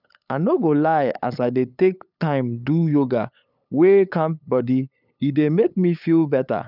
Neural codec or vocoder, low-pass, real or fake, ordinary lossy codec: codec, 16 kHz, 8 kbps, FunCodec, trained on LibriTTS, 25 frames a second; 5.4 kHz; fake; none